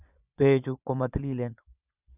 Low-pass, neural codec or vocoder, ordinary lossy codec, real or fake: 3.6 kHz; vocoder, 22.05 kHz, 80 mel bands, Vocos; none; fake